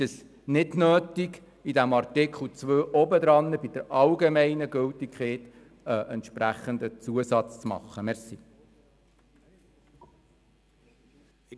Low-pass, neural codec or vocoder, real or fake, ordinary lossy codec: none; none; real; none